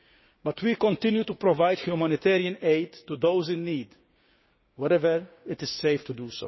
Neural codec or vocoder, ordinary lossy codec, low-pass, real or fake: codec, 16 kHz, 6 kbps, DAC; MP3, 24 kbps; 7.2 kHz; fake